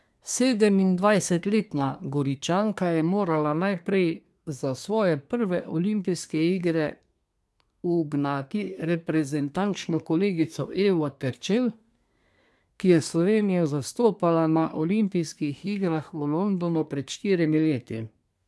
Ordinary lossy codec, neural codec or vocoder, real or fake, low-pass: none; codec, 24 kHz, 1 kbps, SNAC; fake; none